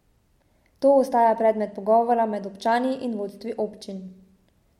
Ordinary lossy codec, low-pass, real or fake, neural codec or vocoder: MP3, 64 kbps; 19.8 kHz; real; none